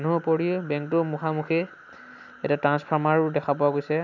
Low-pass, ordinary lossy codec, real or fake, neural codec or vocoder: 7.2 kHz; none; real; none